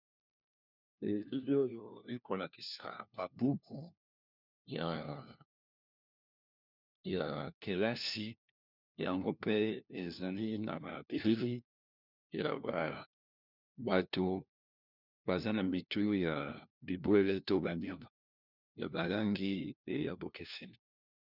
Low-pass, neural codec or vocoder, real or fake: 5.4 kHz; codec, 16 kHz, 1 kbps, FunCodec, trained on LibriTTS, 50 frames a second; fake